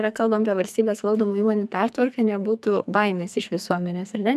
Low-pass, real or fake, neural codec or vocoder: 14.4 kHz; fake; codec, 44.1 kHz, 2.6 kbps, SNAC